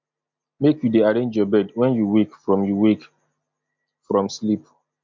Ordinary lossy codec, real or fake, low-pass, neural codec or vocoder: MP3, 48 kbps; real; 7.2 kHz; none